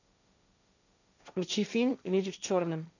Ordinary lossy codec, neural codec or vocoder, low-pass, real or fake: none; codec, 16 kHz, 1.1 kbps, Voila-Tokenizer; 7.2 kHz; fake